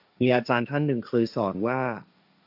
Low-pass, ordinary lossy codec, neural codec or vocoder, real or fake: 5.4 kHz; AAC, 48 kbps; codec, 16 kHz, 1.1 kbps, Voila-Tokenizer; fake